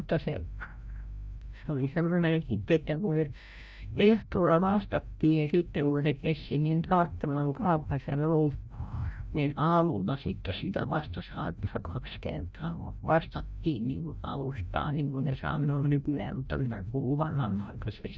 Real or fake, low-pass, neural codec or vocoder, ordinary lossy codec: fake; none; codec, 16 kHz, 0.5 kbps, FreqCodec, larger model; none